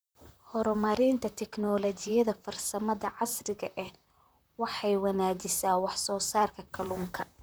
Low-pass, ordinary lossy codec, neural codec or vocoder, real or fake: none; none; vocoder, 44.1 kHz, 128 mel bands, Pupu-Vocoder; fake